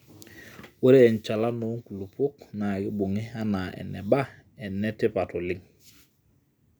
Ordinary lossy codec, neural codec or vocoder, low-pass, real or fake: none; none; none; real